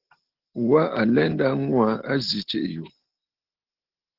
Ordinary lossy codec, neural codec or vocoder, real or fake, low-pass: Opus, 16 kbps; none; real; 5.4 kHz